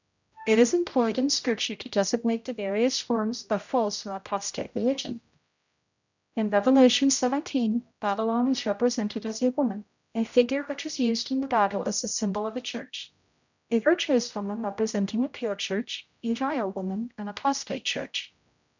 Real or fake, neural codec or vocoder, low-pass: fake; codec, 16 kHz, 0.5 kbps, X-Codec, HuBERT features, trained on general audio; 7.2 kHz